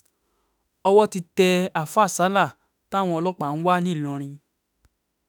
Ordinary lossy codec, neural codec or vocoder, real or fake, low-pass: none; autoencoder, 48 kHz, 32 numbers a frame, DAC-VAE, trained on Japanese speech; fake; none